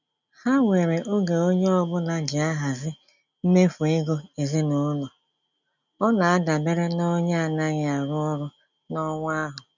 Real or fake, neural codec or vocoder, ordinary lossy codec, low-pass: real; none; none; 7.2 kHz